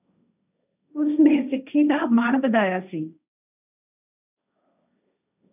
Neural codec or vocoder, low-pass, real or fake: codec, 16 kHz, 1.1 kbps, Voila-Tokenizer; 3.6 kHz; fake